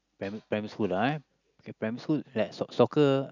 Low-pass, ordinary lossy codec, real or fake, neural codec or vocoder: 7.2 kHz; none; real; none